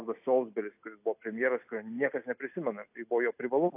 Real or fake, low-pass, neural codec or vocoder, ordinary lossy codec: real; 3.6 kHz; none; AAC, 32 kbps